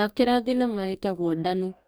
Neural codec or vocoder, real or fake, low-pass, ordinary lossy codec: codec, 44.1 kHz, 2.6 kbps, DAC; fake; none; none